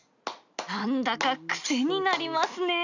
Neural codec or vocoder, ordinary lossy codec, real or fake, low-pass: none; none; real; 7.2 kHz